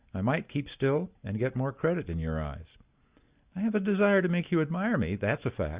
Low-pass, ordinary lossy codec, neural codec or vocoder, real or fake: 3.6 kHz; Opus, 64 kbps; none; real